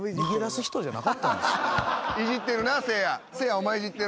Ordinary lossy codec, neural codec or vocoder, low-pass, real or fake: none; none; none; real